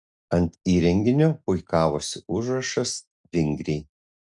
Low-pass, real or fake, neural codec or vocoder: 10.8 kHz; real; none